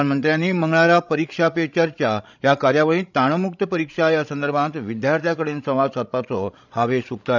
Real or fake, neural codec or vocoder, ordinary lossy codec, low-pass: fake; codec, 16 kHz, 16 kbps, FreqCodec, larger model; none; 7.2 kHz